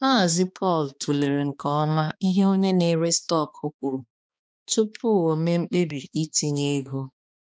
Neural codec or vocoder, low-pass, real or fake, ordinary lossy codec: codec, 16 kHz, 2 kbps, X-Codec, HuBERT features, trained on balanced general audio; none; fake; none